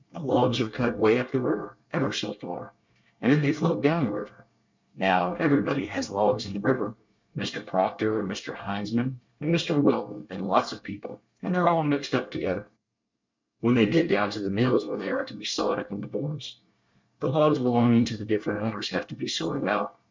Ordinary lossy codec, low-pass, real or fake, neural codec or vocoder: MP3, 64 kbps; 7.2 kHz; fake; codec, 24 kHz, 1 kbps, SNAC